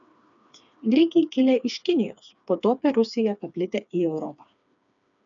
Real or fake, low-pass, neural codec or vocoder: fake; 7.2 kHz; codec, 16 kHz, 4 kbps, FreqCodec, smaller model